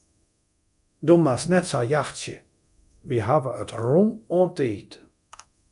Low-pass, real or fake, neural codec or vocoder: 10.8 kHz; fake; codec, 24 kHz, 0.9 kbps, DualCodec